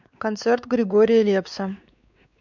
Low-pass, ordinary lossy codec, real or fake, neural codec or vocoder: 7.2 kHz; none; fake; codec, 16 kHz, 4 kbps, X-Codec, WavLM features, trained on Multilingual LibriSpeech